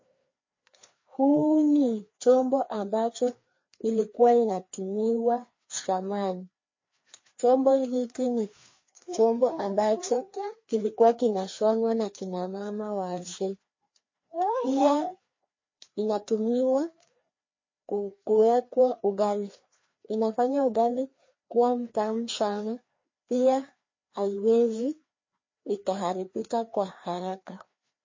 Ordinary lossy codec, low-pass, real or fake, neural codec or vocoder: MP3, 32 kbps; 7.2 kHz; fake; codec, 16 kHz, 2 kbps, FreqCodec, larger model